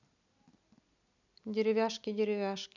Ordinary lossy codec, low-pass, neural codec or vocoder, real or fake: none; 7.2 kHz; none; real